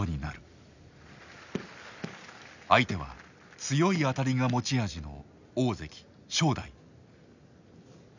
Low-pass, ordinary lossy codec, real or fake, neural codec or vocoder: 7.2 kHz; none; real; none